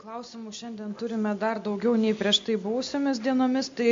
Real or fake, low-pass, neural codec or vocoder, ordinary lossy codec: real; 7.2 kHz; none; MP3, 48 kbps